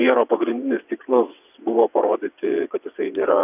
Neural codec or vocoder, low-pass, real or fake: vocoder, 22.05 kHz, 80 mel bands, WaveNeXt; 3.6 kHz; fake